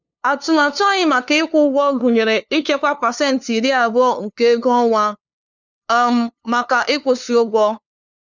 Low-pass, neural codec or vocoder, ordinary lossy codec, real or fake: 7.2 kHz; codec, 16 kHz, 2 kbps, FunCodec, trained on LibriTTS, 25 frames a second; none; fake